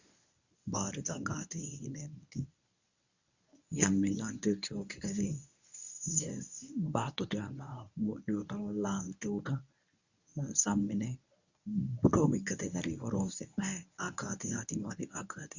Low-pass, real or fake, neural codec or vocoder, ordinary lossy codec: 7.2 kHz; fake; codec, 24 kHz, 0.9 kbps, WavTokenizer, medium speech release version 1; Opus, 64 kbps